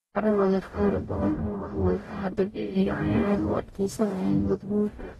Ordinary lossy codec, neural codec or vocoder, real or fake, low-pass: AAC, 32 kbps; codec, 44.1 kHz, 0.9 kbps, DAC; fake; 19.8 kHz